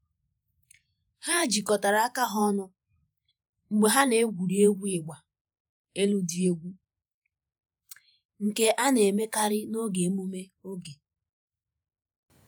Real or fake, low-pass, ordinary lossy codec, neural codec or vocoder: fake; none; none; vocoder, 48 kHz, 128 mel bands, Vocos